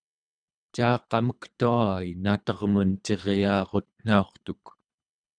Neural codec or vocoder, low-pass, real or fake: codec, 24 kHz, 3 kbps, HILCodec; 9.9 kHz; fake